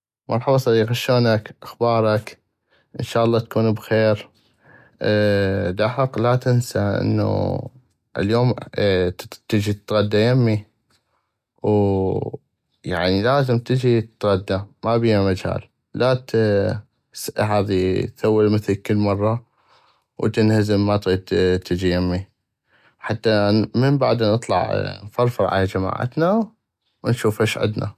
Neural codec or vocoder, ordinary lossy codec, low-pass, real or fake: none; none; 14.4 kHz; real